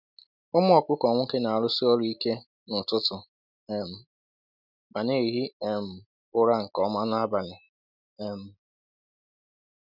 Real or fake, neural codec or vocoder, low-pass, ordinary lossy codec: real; none; 5.4 kHz; none